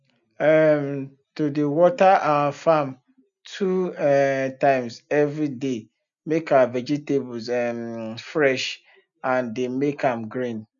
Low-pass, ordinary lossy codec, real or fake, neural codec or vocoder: 7.2 kHz; none; real; none